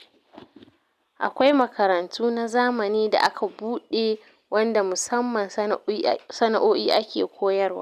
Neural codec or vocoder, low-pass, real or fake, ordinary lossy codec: none; 14.4 kHz; real; none